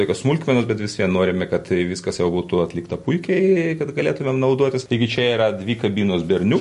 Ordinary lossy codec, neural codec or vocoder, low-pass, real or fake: MP3, 48 kbps; none; 14.4 kHz; real